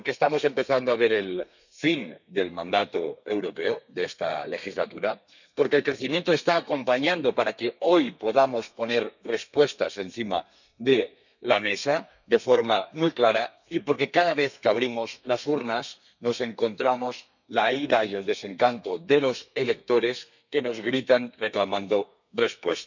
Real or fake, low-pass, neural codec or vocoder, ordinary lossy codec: fake; 7.2 kHz; codec, 32 kHz, 1.9 kbps, SNAC; none